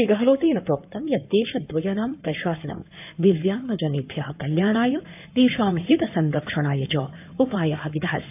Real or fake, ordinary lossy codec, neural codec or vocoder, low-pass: fake; AAC, 32 kbps; codec, 16 kHz in and 24 kHz out, 2.2 kbps, FireRedTTS-2 codec; 3.6 kHz